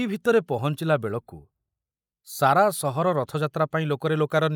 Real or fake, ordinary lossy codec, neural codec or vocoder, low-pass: real; none; none; none